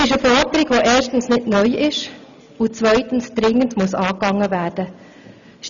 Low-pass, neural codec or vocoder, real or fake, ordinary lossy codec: 7.2 kHz; none; real; none